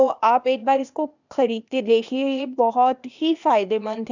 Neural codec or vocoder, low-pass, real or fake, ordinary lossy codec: codec, 16 kHz, 0.8 kbps, ZipCodec; 7.2 kHz; fake; none